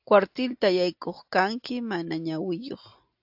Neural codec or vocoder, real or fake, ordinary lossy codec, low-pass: none; real; AAC, 48 kbps; 5.4 kHz